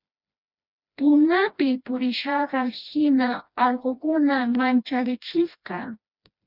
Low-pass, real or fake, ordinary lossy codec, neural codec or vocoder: 5.4 kHz; fake; Opus, 64 kbps; codec, 16 kHz, 1 kbps, FreqCodec, smaller model